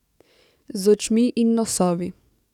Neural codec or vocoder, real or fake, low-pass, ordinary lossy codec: codec, 44.1 kHz, 7.8 kbps, DAC; fake; 19.8 kHz; none